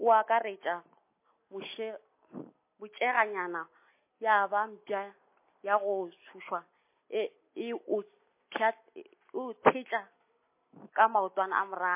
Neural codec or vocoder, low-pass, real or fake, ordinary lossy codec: none; 3.6 kHz; real; MP3, 24 kbps